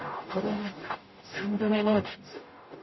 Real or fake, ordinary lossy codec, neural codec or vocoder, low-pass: fake; MP3, 24 kbps; codec, 44.1 kHz, 0.9 kbps, DAC; 7.2 kHz